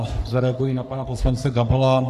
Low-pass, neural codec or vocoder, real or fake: 14.4 kHz; codec, 44.1 kHz, 3.4 kbps, Pupu-Codec; fake